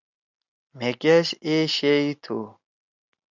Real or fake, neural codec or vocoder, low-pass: real; none; 7.2 kHz